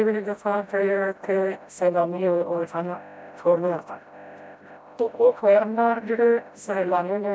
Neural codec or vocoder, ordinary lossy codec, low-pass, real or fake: codec, 16 kHz, 0.5 kbps, FreqCodec, smaller model; none; none; fake